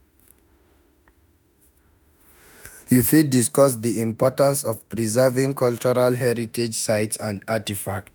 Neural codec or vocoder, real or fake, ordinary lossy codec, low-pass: autoencoder, 48 kHz, 32 numbers a frame, DAC-VAE, trained on Japanese speech; fake; none; none